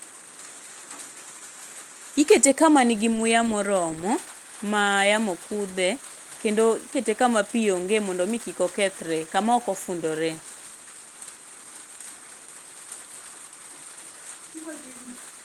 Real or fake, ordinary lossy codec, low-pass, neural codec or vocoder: real; Opus, 24 kbps; 19.8 kHz; none